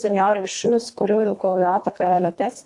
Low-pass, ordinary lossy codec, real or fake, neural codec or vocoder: 10.8 kHz; AAC, 64 kbps; fake; codec, 24 kHz, 1.5 kbps, HILCodec